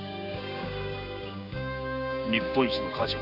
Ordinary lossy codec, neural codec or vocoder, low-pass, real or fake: none; codec, 16 kHz, 6 kbps, DAC; 5.4 kHz; fake